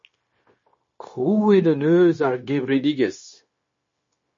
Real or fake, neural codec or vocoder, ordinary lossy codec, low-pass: fake; codec, 16 kHz, 0.9 kbps, LongCat-Audio-Codec; MP3, 32 kbps; 7.2 kHz